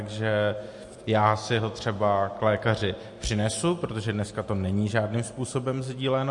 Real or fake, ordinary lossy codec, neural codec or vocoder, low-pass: real; MP3, 48 kbps; none; 10.8 kHz